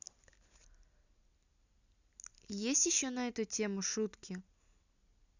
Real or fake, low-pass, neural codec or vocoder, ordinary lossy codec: real; 7.2 kHz; none; none